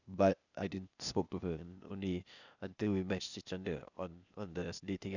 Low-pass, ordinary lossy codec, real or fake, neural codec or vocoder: 7.2 kHz; none; fake; codec, 16 kHz, 0.8 kbps, ZipCodec